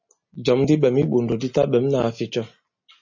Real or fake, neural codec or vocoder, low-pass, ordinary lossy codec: real; none; 7.2 kHz; MP3, 32 kbps